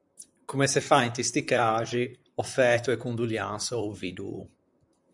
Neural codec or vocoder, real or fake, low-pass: vocoder, 44.1 kHz, 128 mel bands, Pupu-Vocoder; fake; 10.8 kHz